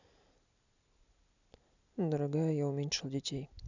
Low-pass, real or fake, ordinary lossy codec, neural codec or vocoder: 7.2 kHz; real; none; none